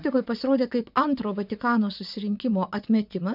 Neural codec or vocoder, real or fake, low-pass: vocoder, 22.05 kHz, 80 mel bands, WaveNeXt; fake; 5.4 kHz